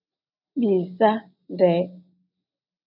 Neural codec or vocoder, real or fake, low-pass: none; real; 5.4 kHz